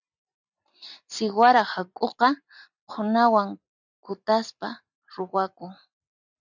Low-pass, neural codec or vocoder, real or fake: 7.2 kHz; none; real